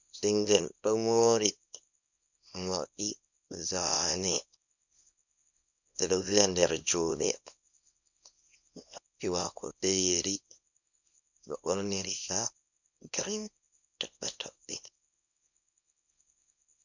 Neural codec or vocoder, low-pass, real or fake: codec, 24 kHz, 0.9 kbps, WavTokenizer, small release; 7.2 kHz; fake